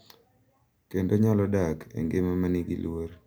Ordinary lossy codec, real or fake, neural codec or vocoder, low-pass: none; real; none; none